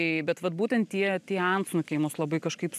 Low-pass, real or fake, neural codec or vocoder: 14.4 kHz; real; none